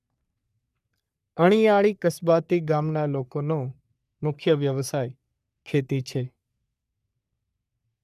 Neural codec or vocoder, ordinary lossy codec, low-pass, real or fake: codec, 44.1 kHz, 3.4 kbps, Pupu-Codec; none; 14.4 kHz; fake